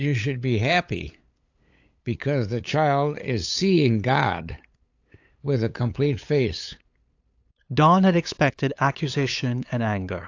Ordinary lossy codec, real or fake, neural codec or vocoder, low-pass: AAC, 48 kbps; fake; codec, 16 kHz, 8 kbps, FunCodec, trained on LibriTTS, 25 frames a second; 7.2 kHz